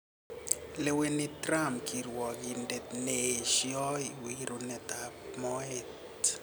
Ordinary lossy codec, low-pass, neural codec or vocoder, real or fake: none; none; none; real